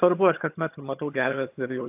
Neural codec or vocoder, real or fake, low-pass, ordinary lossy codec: vocoder, 22.05 kHz, 80 mel bands, HiFi-GAN; fake; 3.6 kHz; AAC, 32 kbps